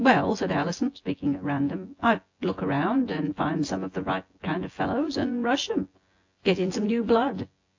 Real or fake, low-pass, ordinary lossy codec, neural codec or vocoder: fake; 7.2 kHz; AAC, 48 kbps; vocoder, 24 kHz, 100 mel bands, Vocos